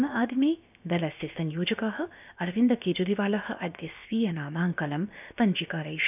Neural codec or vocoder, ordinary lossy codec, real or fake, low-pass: codec, 16 kHz, about 1 kbps, DyCAST, with the encoder's durations; none; fake; 3.6 kHz